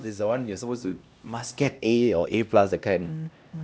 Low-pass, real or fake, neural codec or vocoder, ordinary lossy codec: none; fake; codec, 16 kHz, 1 kbps, X-Codec, HuBERT features, trained on LibriSpeech; none